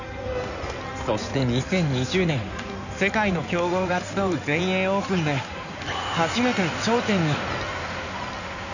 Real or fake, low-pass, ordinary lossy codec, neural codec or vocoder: fake; 7.2 kHz; none; codec, 16 kHz in and 24 kHz out, 2.2 kbps, FireRedTTS-2 codec